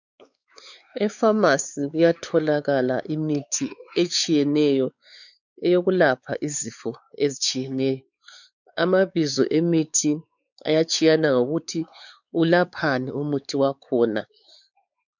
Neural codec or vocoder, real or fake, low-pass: codec, 16 kHz, 4 kbps, X-Codec, WavLM features, trained on Multilingual LibriSpeech; fake; 7.2 kHz